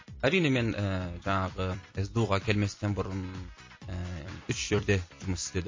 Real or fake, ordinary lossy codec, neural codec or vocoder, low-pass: fake; MP3, 32 kbps; vocoder, 44.1 kHz, 128 mel bands every 256 samples, BigVGAN v2; 7.2 kHz